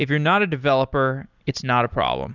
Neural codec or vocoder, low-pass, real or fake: none; 7.2 kHz; real